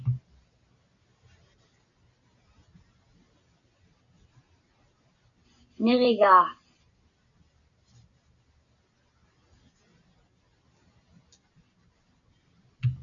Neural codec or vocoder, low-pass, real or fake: none; 7.2 kHz; real